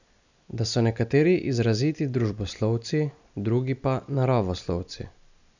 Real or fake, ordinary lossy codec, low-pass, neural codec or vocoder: real; none; 7.2 kHz; none